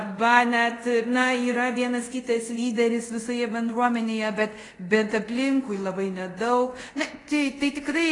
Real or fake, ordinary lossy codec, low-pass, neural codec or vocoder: fake; AAC, 32 kbps; 10.8 kHz; codec, 24 kHz, 0.5 kbps, DualCodec